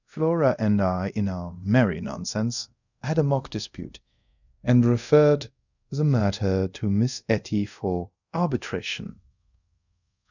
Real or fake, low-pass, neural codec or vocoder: fake; 7.2 kHz; codec, 24 kHz, 0.5 kbps, DualCodec